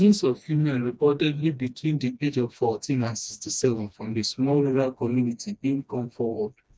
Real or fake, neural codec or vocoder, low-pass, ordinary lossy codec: fake; codec, 16 kHz, 1 kbps, FreqCodec, smaller model; none; none